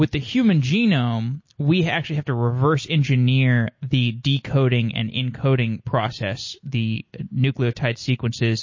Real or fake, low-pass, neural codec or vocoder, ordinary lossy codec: real; 7.2 kHz; none; MP3, 32 kbps